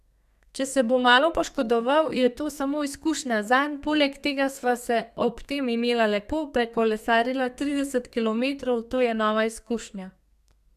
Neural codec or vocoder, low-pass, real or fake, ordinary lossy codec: codec, 32 kHz, 1.9 kbps, SNAC; 14.4 kHz; fake; none